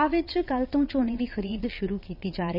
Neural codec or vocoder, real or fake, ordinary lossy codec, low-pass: vocoder, 22.05 kHz, 80 mel bands, Vocos; fake; none; 5.4 kHz